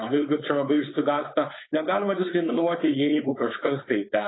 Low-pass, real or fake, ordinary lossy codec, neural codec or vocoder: 7.2 kHz; fake; AAC, 16 kbps; codec, 16 kHz, 4.8 kbps, FACodec